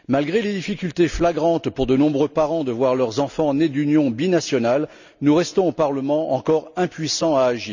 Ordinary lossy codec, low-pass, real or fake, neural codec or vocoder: none; 7.2 kHz; real; none